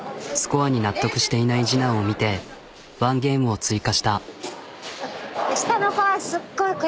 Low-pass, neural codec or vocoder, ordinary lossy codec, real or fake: none; none; none; real